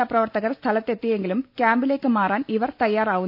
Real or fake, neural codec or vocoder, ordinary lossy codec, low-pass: real; none; none; 5.4 kHz